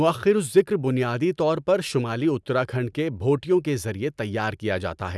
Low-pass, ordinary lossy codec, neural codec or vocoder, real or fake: none; none; none; real